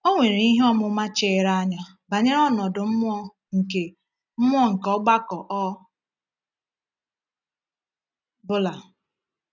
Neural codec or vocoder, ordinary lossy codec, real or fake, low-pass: none; none; real; 7.2 kHz